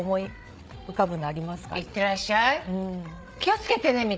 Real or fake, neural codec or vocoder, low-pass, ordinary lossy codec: fake; codec, 16 kHz, 8 kbps, FreqCodec, larger model; none; none